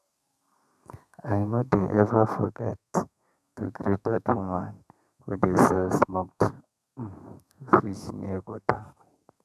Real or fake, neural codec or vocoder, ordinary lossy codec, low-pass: fake; codec, 32 kHz, 1.9 kbps, SNAC; none; 14.4 kHz